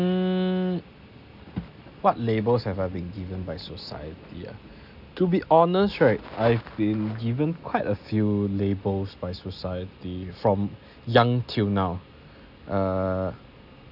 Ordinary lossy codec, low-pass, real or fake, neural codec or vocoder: none; 5.4 kHz; real; none